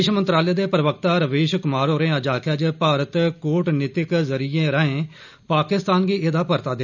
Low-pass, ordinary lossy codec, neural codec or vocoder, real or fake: 7.2 kHz; none; none; real